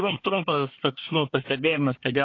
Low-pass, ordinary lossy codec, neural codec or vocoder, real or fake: 7.2 kHz; AAC, 32 kbps; codec, 24 kHz, 1 kbps, SNAC; fake